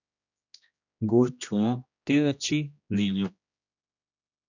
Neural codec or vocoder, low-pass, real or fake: codec, 16 kHz, 1 kbps, X-Codec, HuBERT features, trained on general audio; 7.2 kHz; fake